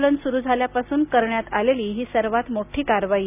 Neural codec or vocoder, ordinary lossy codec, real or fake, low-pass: none; none; real; 3.6 kHz